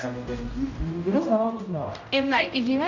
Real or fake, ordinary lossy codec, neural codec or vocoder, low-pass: fake; none; codec, 16 kHz, 0.5 kbps, X-Codec, HuBERT features, trained on general audio; 7.2 kHz